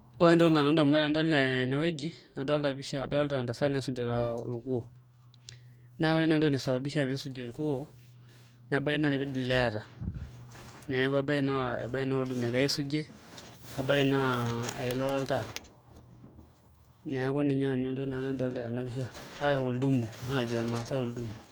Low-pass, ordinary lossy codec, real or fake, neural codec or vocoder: none; none; fake; codec, 44.1 kHz, 2.6 kbps, DAC